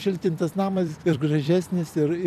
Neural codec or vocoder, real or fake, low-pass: vocoder, 44.1 kHz, 128 mel bands every 256 samples, BigVGAN v2; fake; 14.4 kHz